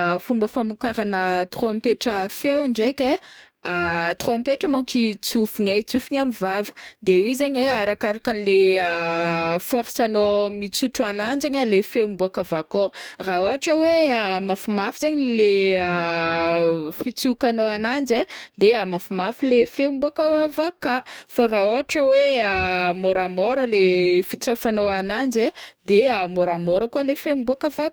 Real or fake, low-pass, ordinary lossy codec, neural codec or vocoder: fake; none; none; codec, 44.1 kHz, 2.6 kbps, DAC